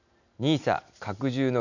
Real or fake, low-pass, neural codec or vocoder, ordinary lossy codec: real; 7.2 kHz; none; none